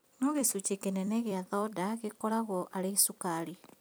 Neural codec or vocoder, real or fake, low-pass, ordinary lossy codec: vocoder, 44.1 kHz, 128 mel bands every 256 samples, BigVGAN v2; fake; none; none